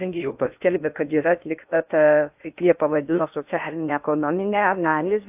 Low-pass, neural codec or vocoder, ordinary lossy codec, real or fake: 3.6 kHz; codec, 16 kHz in and 24 kHz out, 0.6 kbps, FocalCodec, streaming, 2048 codes; AAC, 32 kbps; fake